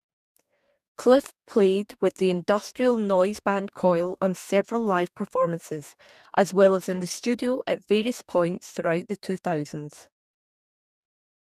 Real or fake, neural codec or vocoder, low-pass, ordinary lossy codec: fake; codec, 44.1 kHz, 2.6 kbps, DAC; 14.4 kHz; none